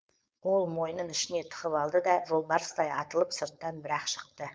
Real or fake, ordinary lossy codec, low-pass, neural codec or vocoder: fake; none; none; codec, 16 kHz, 4.8 kbps, FACodec